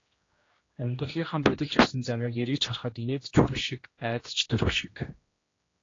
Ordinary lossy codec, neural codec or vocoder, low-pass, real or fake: AAC, 32 kbps; codec, 16 kHz, 1 kbps, X-Codec, HuBERT features, trained on general audio; 7.2 kHz; fake